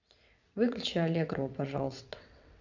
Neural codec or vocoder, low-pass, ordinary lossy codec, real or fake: vocoder, 22.05 kHz, 80 mel bands, WaveNeXt; 7.2 kHz; none; fake